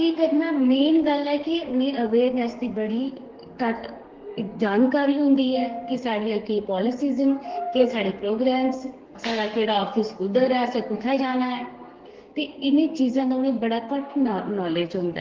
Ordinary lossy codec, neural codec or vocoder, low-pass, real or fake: Opus, 16 kbps; codec, 32 kHz, 1.9 kbps, SNAC; 7.2 kHz; fake